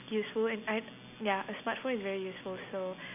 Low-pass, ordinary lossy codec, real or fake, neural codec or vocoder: 3.6 kHz; none; real; none